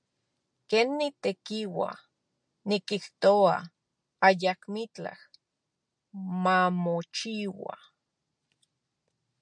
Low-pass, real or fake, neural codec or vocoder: 9.9 kHz; real; none